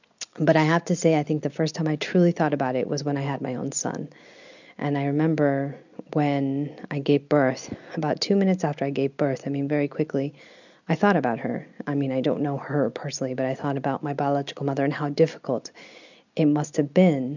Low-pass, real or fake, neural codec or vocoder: 7.2 kHz; real; none